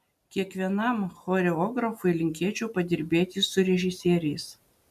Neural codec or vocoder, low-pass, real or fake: none; 14.4 kHz; real